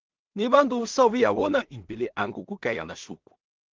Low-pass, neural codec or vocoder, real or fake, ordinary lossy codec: 7.2 kHz; codec, 16 kHz in and 24 kHz out, 0.4 kbps, LongCat-Audio-Codec, two codebook decoder; fake; Opus, 32 kbps